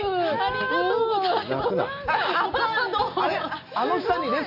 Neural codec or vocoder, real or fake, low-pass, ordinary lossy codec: none; real; 5.4 kHz; none